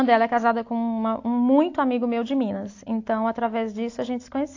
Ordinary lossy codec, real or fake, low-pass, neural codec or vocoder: AAC, 48 kbps; real; 7.2 kHz; none